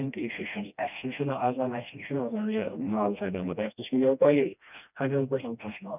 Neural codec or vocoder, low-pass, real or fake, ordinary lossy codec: codec, 16 kHz, 1 kbps, FreqCodec, smaller model; 3.6 kHz; fake; none